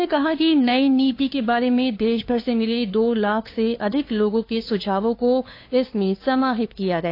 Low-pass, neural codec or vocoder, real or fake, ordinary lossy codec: 5.4 kHz; codec, 16 kHz, 2 kbps, FunCodec, trained on LibriTTS, 25 frames a second; fake; AAC, 32 kbps